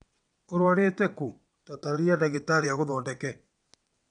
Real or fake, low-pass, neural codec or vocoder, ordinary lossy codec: fake; 9.9 kHz; vocoder, 22.05 kHz, 80 mel bands, WaveNeXt; none